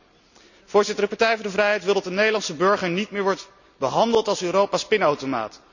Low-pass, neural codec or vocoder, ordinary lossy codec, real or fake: 7.2 kHz; none; none; real